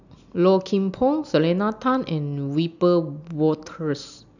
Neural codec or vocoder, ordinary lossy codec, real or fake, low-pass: none; none; real; 7.2 kHz